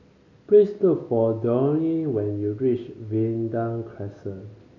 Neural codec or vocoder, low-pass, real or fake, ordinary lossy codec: none; 7.2 kHz; real; none